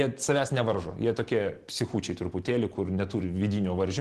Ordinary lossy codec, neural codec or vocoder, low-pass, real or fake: Opus, 16 kbps; none; 10.8 kHz; real